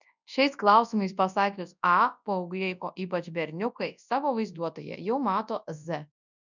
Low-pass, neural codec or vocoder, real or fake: 7.2 kHz; codec, 24 kHz, 0.9 kbps, WavTokenizer, large speech release; fake